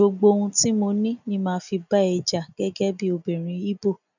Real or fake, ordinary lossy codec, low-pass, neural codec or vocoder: real; none; 7.2 kHz; none